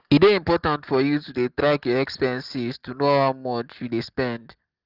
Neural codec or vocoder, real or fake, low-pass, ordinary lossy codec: none; real; 5.4 kHz; Opus, 16 kbps